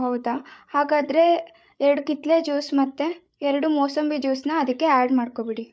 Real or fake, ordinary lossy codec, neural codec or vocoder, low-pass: fake; none; codec, 16 kHz, 8 kbps, FreqCodec, larger model; none